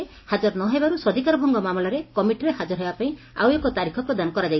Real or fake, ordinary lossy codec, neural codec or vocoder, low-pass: real; MP3, 24 kbps; none; 7.2 kHz